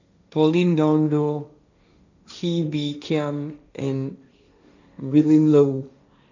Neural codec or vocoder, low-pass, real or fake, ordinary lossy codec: codec, 16 kHz, 1.1 kbps, Voila-Tokenizer; 7.2 kHz; fake; none